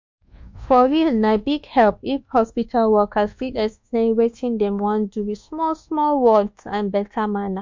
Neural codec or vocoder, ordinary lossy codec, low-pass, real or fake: codec, 24 kHz, 1.2 kbps, DualCodec; MP3, 48 kbps; 7.2 kHz; fake